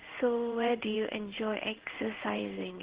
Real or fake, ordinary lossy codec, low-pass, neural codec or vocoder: fake; Opus, 16 kbps; 3.6 kHz; codec, 16 kHz in and 24 kHz out, 1 kbps, XY-Tokenizer